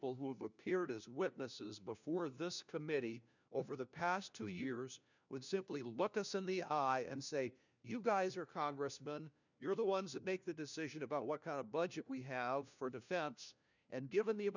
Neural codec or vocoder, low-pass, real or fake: codec, 16 kHz, 1 kbps, FunCodec, trained on LibriTTS, 50 frames a second; 7.2 kHz; fake